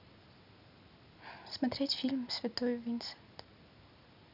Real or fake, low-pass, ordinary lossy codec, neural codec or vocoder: real; 5.4 kHz; none; none